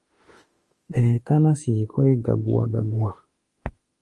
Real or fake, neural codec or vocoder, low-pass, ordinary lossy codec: fake; autoencoder, 48 kHz, 32 numbers a frame, DAC-VAE, trained on Japanese speech; 10.8 kHz; Opus, 24 kbps